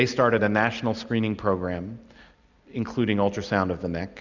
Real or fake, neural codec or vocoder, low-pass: real; none; 7.2 kHz